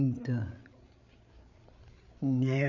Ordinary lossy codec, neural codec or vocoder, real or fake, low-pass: none; codec, 16 kHz, 8 kbps, FreqCodec, larger model; fake; 7.2 kHz